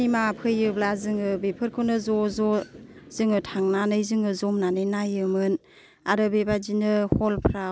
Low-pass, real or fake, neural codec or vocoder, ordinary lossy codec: none; real; none; none